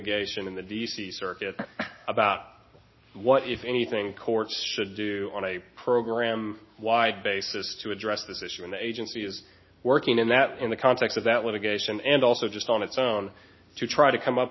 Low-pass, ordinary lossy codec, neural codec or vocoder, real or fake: 7.2 kHz; MP3, 24 kbps; none; real